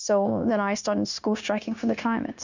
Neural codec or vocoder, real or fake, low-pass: codec, 16 kHz, 0.9 kbps, LongCat-Audio-Codec; fake; 7.2 kHz